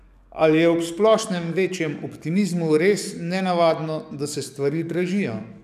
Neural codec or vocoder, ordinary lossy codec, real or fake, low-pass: codec, 44.1 kHz, 7.8 kbps, DAC; none; fake; 14.4 kHz